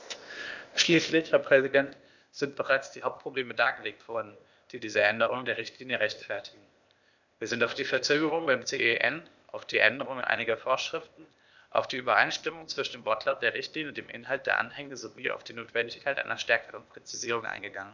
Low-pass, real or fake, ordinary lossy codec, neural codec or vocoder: 7.2 kHz; fake; none; codec, 16 kHz, 0.8 kbps, ZipCodec